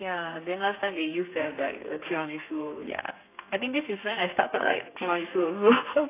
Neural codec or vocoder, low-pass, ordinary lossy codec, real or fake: codec, 32 kHz, 1.9 kbps, SNAC; 3.6 kHz; AAC, 24 kbps; fake